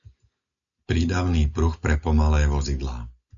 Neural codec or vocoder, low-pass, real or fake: none; 7.2 kHz; real